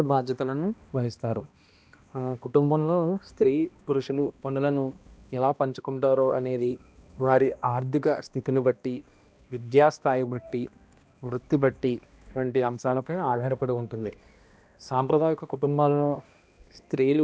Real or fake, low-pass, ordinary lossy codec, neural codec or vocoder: fake; none; none; codec, 16 kHz, 1 kbps, X-Codec, HuBERT features, trained on balanced general audio